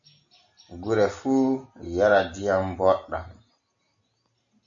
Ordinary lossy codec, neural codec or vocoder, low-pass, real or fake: AAC, 64 kbps; none; 7.2 kHz; real